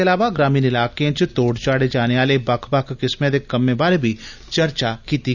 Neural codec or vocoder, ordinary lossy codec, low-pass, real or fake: none; none; 7.2 kHz; real